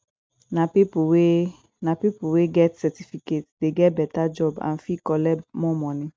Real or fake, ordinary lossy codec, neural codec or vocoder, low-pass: real; none; none; none